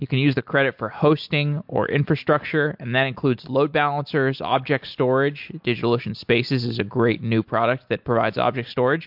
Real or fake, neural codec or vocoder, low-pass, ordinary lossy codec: real; none; 5.4 kHz; MP3, 48 kbps